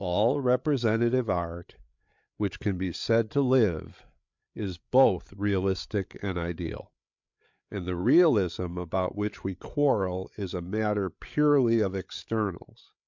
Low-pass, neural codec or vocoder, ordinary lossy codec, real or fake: 7.2 kHz; codec, 16 kHz, 8 kbps, FreqCodec, larger model; MP3, 64 kbps; fake